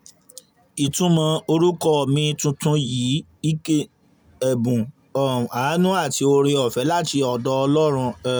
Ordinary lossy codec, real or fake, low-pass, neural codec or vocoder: none; real; none; none